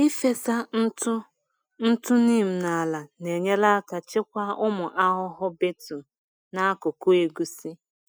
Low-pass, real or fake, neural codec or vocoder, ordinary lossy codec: none; real; none; none